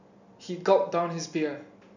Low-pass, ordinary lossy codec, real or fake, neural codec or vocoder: 7.2 kHz; none; real; none